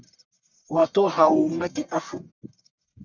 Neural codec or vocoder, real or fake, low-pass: codec, 44.1 kHz, 1.7 kbps, Pupu-Codec; fake; 7.2 kHz